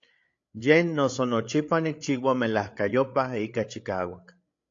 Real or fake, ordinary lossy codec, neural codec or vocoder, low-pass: fake; AAC, 48 kbps; codec, 16 kHz, 8 kbps, FreqCodec, larger model; 7.2 kHz